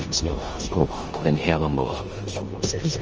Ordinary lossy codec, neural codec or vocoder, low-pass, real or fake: Opus, 24 kbps; codec, 16 kHz in and 24 kHz out, 0.9 kbps, LongCat-Audio-Codec, four codebook decoder; 7.2 kHz; fake